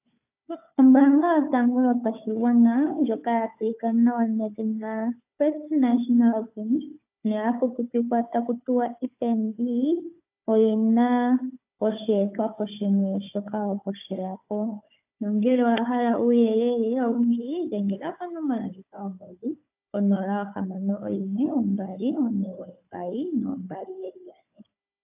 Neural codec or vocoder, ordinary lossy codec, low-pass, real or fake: codec, 16 kHz, 4 kbps, FunCodec, trained on Chinese and English, 50 frames a second; AAC, 32 kbps; 3.6 kHz; fake